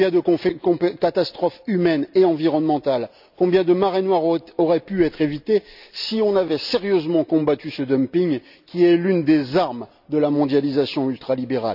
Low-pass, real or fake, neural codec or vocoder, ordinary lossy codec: 5.4 kHz; real; none; none